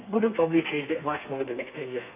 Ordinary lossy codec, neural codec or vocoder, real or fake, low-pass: none; codec, 32 kHz, 1.9 kbps, SNAC; fake; 3.6 kHz